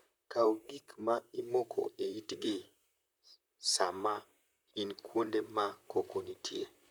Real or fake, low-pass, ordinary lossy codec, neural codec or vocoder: fake; none; none; vocoder, 44.1 kHz, 128 mel bands, Pupu-Vocoder